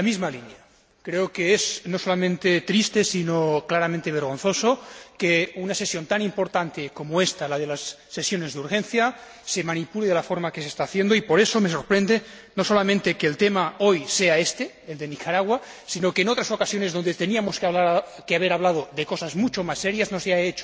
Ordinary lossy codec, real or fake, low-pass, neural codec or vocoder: none; real; none; none